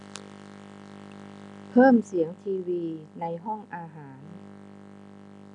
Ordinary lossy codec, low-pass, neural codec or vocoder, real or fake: none; 10.8 kHz; none; real